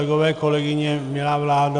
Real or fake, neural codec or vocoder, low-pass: real; none; 9.9 kHz